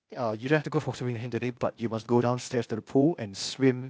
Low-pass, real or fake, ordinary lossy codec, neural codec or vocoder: none; fake; none; codec, 16 kHz, 0.8 kbps, ZipCodec